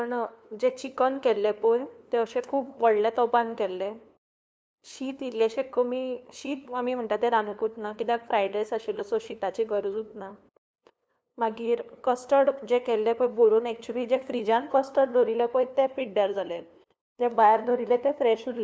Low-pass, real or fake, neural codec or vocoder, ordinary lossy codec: none; fake; codec, 16 kHz, 2 kbps, FunCodec, trained on LibriTTS, 25 frames a second; none